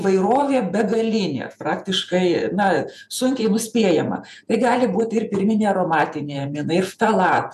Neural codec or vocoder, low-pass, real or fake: vocoder, 48 kHz, 128 mel bands, Vocos; 14.4 kHz; fake